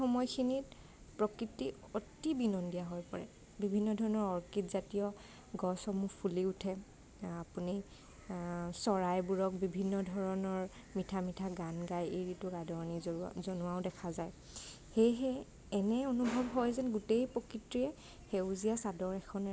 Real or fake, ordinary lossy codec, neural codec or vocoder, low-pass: real; none; none; none